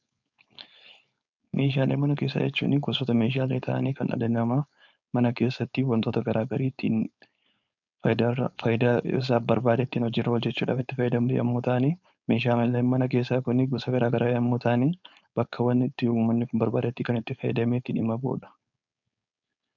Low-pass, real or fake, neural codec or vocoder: 7.2 kHz; fake; codec, 16 kHz, 4.8 kbps, FACodec